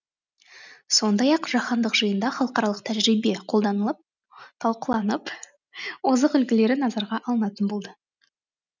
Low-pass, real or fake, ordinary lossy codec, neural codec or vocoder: 7.2 kHz; real; none; none